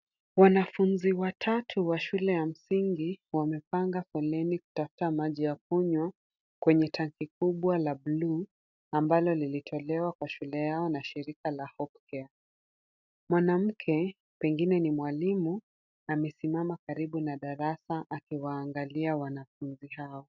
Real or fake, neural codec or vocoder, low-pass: real; none; 7.2 kHz